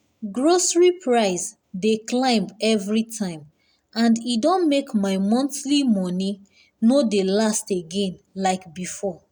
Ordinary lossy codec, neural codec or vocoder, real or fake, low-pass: none; none; real; 19.8 kHz